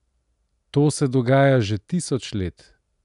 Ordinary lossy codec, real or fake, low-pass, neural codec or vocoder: none; real; 10.8 kHz; none